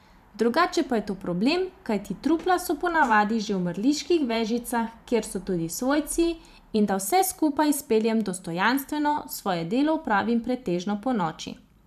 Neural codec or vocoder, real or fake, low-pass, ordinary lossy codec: vocoder, 44.1 kHz, 128 mel bands every 512 samples, BigVGAN v2; fake; 14.4 kHz; none